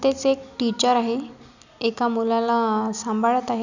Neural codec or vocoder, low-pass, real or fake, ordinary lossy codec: none; 7.2 kHz; real; none